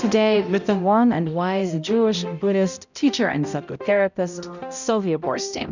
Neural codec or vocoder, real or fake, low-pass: codec, 16 kHz, 0.5 kbps, X-Codec, HuBERT features, trained on balanced general audio; fake; 7.2 kHz